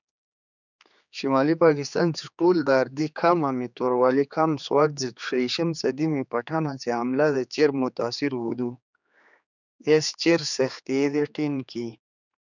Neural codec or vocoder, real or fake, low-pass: codec, 16 kHz, 4 kbps, X-Codec, HuBERT features, trained on general audio; fake; 7.2 kHz